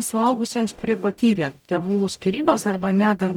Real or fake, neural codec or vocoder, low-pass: fake; codec, 44.1 kHz, 0.9 kbps, DAC; 19.8 kHz